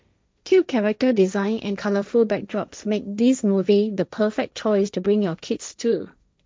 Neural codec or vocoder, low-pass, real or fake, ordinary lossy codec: codec, 16 kHz, 1.1 kbps, Voila-Tokenizer; none; fake; none